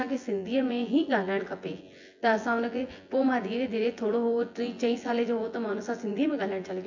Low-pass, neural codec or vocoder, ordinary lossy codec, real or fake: 7.2 kHz; vocoder, 24 kHz, 100 mel bands, Vocos; MP3, 48 kbps; fake